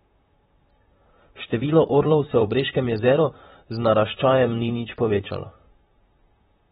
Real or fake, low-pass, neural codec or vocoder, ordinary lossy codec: fake; 19.8 kHz; vocoder, 44.1 kHz, 128 mel bands, Pupu-Vocoder; AAC, 16 kbps